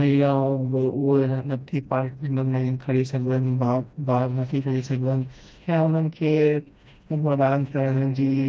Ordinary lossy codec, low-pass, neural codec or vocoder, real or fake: none; none; codec, 16 kHz, 1 kbps, FreqCodec, smaller model; fake